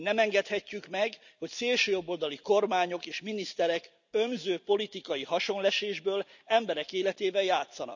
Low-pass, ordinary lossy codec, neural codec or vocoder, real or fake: 7.2 kHz; none; none; real